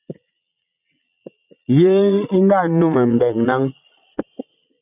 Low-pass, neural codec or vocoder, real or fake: 3.6 kHz; vocoder, 22.05 kHz, 80 mel bands, Vocos; fake